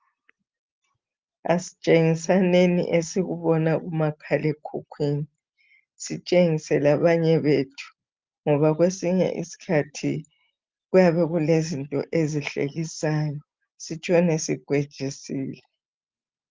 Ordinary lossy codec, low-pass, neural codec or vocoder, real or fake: Opus, 24 kbps; 7.2 kHz; none; real